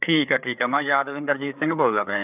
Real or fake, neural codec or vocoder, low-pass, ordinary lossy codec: fake; codec, 16 kHz, 4 kbps, FreqCodec, larger model; 3.6 kHz; none